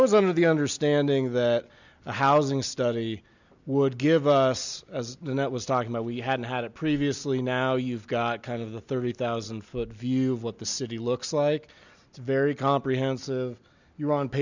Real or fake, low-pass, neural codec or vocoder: real; 7.2 kHz; none